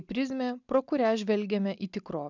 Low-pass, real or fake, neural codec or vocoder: 7.2 kHz; real; none